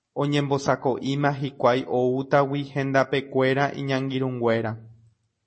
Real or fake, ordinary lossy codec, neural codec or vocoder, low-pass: real; MP3, 32 kbps; none; 9.9 kHz